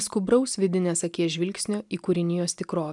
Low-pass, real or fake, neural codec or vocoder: 10.8 kHz; real; none